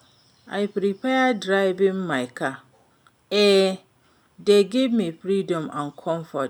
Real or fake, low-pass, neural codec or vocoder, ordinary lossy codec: real; 19.8 kHz; none; none